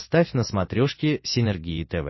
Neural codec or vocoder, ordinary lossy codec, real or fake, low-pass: none; MP3, 24 kbps; real; 7.2 kHz